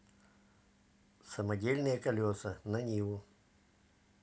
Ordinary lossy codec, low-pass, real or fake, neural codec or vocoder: none; none; real; none